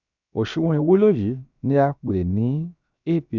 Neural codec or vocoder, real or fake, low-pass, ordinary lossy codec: codec, 16 kHz, 0.7 kbps, FocalCodec; fake; 7.2 kHz; none